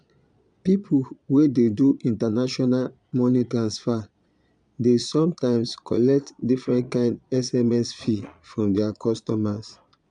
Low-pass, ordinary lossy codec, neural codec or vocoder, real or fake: 9.9 kHz; none; vocoder, 22.05 kHz, 80 mel bands, Vocos; fake